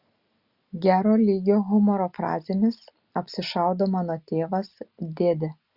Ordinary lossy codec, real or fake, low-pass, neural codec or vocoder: Opus, 64 kbps; real; 5.4 kHz; none